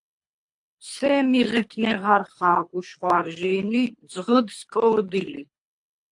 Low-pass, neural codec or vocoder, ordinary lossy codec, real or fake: 10.8 kHz; codec, 24 kHz, 3 kbps, HILCodec; AAC, 64 kbps; fake